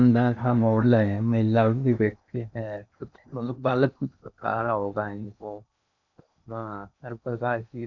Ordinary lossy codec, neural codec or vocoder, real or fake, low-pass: none; codec, 16 kHz in and 24 kHz out, 0.8 kbps, FocalCodec, streaming, 65536 codes; fake; 7.2 kHz